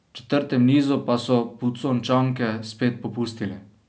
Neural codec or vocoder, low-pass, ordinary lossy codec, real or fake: none; none; none; real